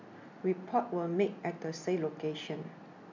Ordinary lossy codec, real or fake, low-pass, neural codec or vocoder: AAC, 48 kbps; real; 7.2 kHz; none